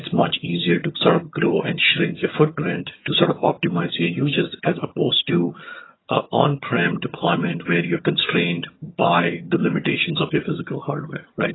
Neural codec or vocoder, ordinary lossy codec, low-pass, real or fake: vocoder, 22.05 kHz, 80 mel bands, HiFi-GAN; AAC, 16 kbps; 7.2 kHz; fake